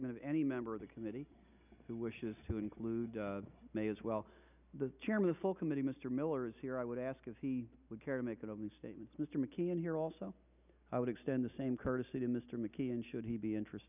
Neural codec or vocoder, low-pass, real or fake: none; 3.6 kHz; real